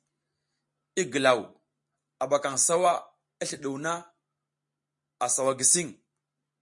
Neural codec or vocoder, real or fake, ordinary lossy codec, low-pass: none; real; MP3, 48 kbps; 10.8 kHz